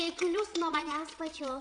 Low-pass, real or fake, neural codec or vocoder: 9.9 kHz; fake; vocoder, 22.05 kHz, 80 mel bands, Vocos